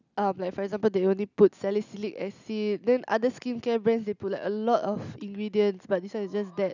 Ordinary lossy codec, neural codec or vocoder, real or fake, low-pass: none; none; real; 7.2 kHz